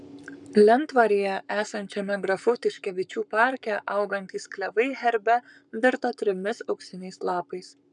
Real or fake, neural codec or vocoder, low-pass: fake; codec, 44.1 kHz, 7.8 kbps, Pupu-Codec; 10.8 kHz